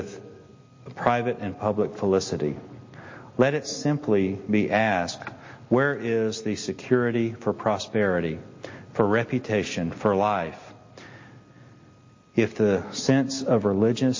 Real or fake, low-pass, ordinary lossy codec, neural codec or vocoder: real; 7.2 kHz; MP3, 32 kbps; none